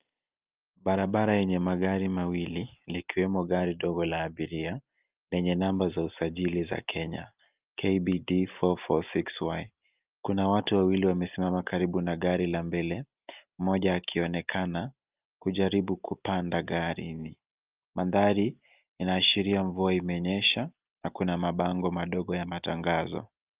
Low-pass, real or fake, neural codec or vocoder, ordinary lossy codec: 3.6 kHz; real; none; Opus, 24 kbps